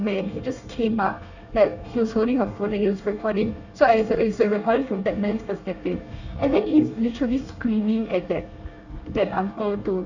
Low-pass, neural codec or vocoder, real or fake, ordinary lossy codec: 7.2 kHz; codec, 24 kHz, 1 kbps, SNAC; fake; none